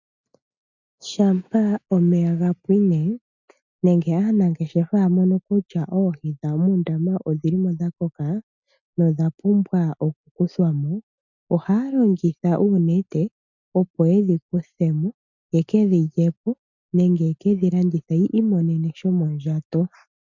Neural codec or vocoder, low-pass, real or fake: none; 7.2 kHz; real